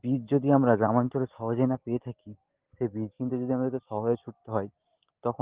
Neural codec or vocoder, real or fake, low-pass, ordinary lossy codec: none; real; 3.6 kHz; Opus, 16 kbps